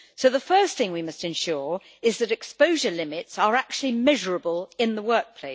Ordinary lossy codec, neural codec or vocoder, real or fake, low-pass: none; none; real; none